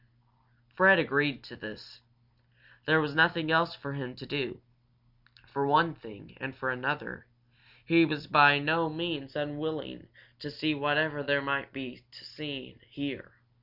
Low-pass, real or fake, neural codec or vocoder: 5.4 kHz; real; none